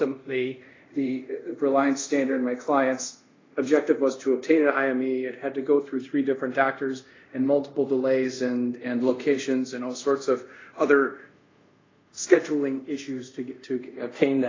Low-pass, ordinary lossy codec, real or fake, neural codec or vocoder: 7.2 kHz; AAC, 32 kbps; fake; codec, 24 kHz, 0.5 kbps, DualCodec